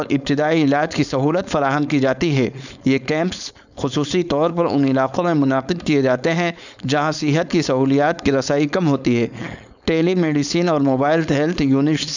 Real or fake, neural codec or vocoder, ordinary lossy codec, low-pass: fake; codec, 16 kHz, 4.8 kbps, FACodec; none; 7.2 kHz